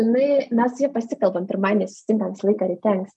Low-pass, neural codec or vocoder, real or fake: 10.8 kHz; none; real